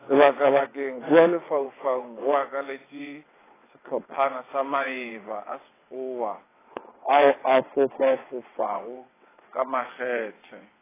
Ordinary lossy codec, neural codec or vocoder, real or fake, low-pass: AAC, 16 kbps; vocoder, 22.05 kHz, 80 mel bands, WaveNeXt; fake; 3.6 kHz